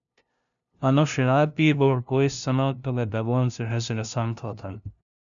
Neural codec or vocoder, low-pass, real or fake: codec, 16 kHz, 0.5 kbps, FunCodec, trained on LibriTTS, 25 frames a second; 7.2 kHz; fake